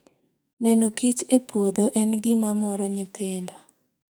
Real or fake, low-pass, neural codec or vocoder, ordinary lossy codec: fake; none; codec, 44.1 kHz, 2.6 kbps, SNAC; none